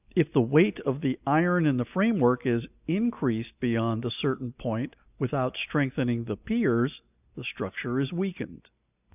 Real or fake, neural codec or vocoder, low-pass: real; none; 3.6 kHz